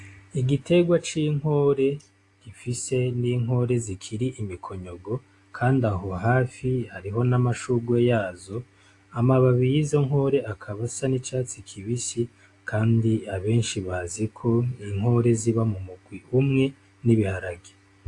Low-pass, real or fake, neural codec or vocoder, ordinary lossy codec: 10.8 kHz; real; none; AAC, 48 kbps